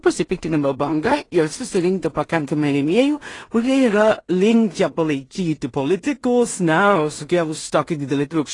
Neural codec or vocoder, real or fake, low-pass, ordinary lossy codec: codec, 16 kHz in and 24 kHz out, 0.4 kbps, LongCat-Audio-Codec, two codebook decoder; fake; 10.8 kHz; AAC, 32 kbps